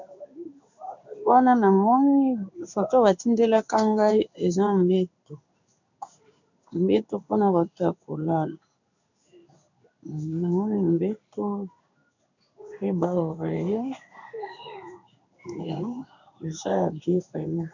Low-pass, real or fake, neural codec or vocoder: 7.2 kHz; fake; codec, 16 kHz in and 24 kHz out, 1 kbps, XY-Tokenizer